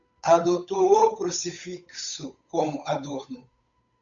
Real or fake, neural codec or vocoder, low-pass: fake; codec, 16 kHz, 8 kbps, FunCodec, trained on Chinese and English, 25 frames a second; 7.2 kHz